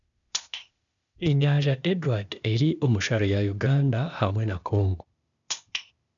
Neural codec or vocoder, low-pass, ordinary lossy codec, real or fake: codec, 16 kHz, 0.8 kbps, ZipCodec; 7.2 kHz; none; fake